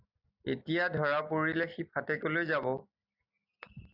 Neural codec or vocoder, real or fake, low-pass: none; real; 5.4 kHz